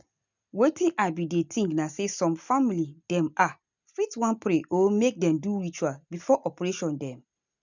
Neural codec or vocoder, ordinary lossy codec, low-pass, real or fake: none; none; 7.2 kHz; real